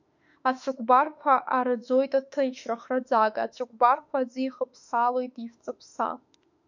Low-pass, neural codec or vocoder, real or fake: 7.2 kHz; autoencoder, 48 kHz, 32 numbers a frame, DAC-VAE, trained on Japanese speech; fake